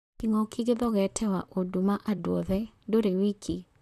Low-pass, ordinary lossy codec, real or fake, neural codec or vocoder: 14.4 kHz; none; fake; vocoder, 44.1 kHz, 128 mel bands, Pupu-Vocoder